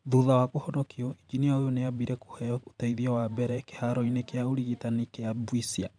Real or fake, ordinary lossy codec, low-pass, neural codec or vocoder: fake; none; 9.9 kHz; vocoder, 44.1 kHz, 128 mel bands every 512 samples, BigVGAN v2